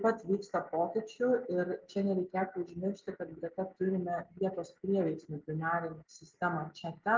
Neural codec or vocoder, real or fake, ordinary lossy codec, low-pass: none; real; Opus, 32 kbps; 7.2 kHz